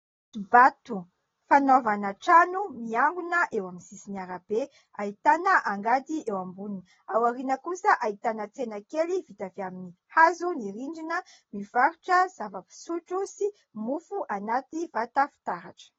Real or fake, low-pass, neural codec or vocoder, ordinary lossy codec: real; 7.2 kHz; none; AAC, 24 kbps